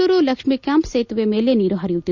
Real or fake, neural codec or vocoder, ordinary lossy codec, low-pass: real; none; none; 7.2 kHz